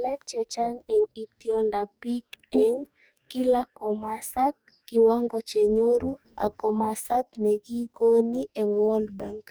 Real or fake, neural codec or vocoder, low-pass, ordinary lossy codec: fake; codec, 44.1 kHz, 2.6 kbps, DAC; none; none